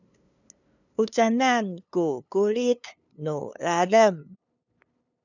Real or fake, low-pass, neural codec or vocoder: fake; 7.2 kHz; codec, 16 kHz, 2 kbps, FunCodec, trained on LibriTTS, 25 frames a second